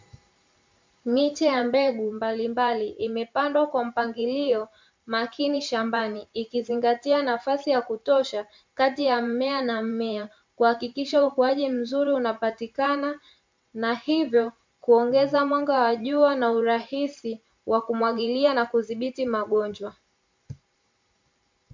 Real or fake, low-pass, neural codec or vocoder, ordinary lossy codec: fake; 7.2 kHz; vocoder, 44.1 kHz, 128 mel bands every 512 samples, BigVGAN v2; MP3, 64 kbps